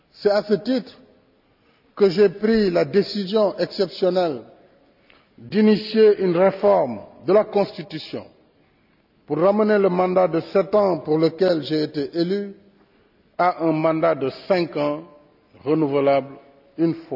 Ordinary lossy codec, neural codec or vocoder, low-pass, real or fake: none; none; 5.4 kHz; real